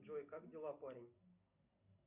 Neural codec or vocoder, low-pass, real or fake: none; 3.6 kHz; real